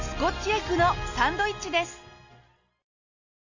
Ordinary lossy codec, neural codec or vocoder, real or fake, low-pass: none; none; real; 7.2 kHz